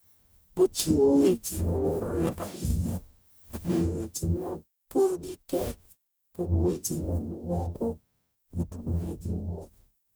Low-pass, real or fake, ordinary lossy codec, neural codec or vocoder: none; fake; none; codec, 44.1 kHz, 0.9 kbps, DAC